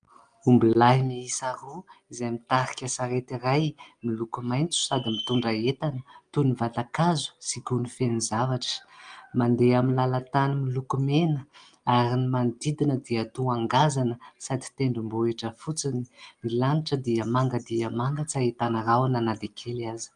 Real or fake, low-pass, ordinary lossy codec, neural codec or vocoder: real; 9.9 kHz; Opus, 32 kbps; none